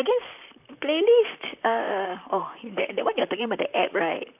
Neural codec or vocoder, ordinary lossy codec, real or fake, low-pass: vocoder, 44.1 kHz, 128 mel bands, Pupu-Vocoder; none; fake; 3.6 kHz